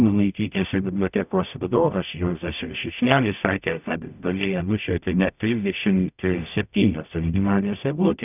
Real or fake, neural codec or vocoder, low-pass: fake; codec, 44.1 kHz, 0.9 kbps, DAC; 3.6 kHz